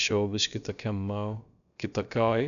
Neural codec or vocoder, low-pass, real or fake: codec, 16 kHz, about 1 kbps, DyCAST, with the encoder's durations; 7.2 kHz; fake